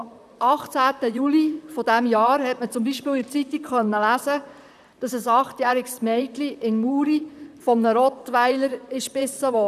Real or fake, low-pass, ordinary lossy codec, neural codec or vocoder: fake; 14.4 kHz; none; vocoder, 44.1 kHz, 128 mel bands, Pupu-Vocoder